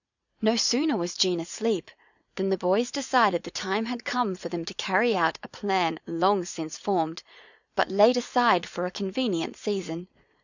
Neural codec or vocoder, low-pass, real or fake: vocoder, 44.1 kHz, 80 mel bands, Vocos; 7.2 kHz; fake